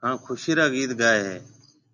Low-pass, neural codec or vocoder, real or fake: 7.2 kHz; none; real